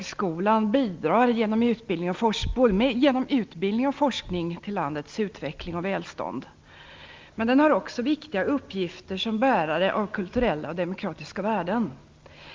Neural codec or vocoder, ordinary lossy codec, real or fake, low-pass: none; Opus, 32 kbps; real; 7.2 kHz